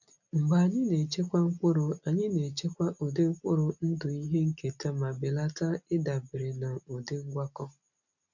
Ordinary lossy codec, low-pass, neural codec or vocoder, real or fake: none; 7.2 kHz; none; real